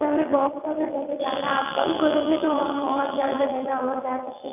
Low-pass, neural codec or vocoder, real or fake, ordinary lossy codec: 3.6 kHz; vocoder, 22.05 kHz, 80 mel bands, WaveNeXt; fake; none